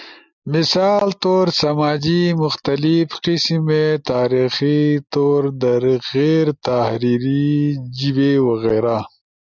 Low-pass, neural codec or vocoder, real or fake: 7.2 kHz; none; real